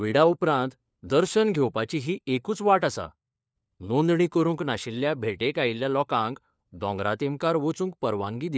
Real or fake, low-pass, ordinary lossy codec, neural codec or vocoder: fake; none; none; codec, 16 kHz, 4 kbps, FunCodec, trained on LibriTTS, 50 frames a second